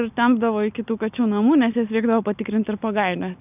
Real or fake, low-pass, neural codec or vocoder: real; 3.6 kHz; none